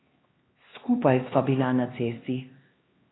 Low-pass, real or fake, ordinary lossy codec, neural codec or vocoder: 7.2 kHz; fake; AAC, 16 kbps; codec, 16 kHz, 2 kbps, X-Codec, HuBERT features, trained on LibriSpeech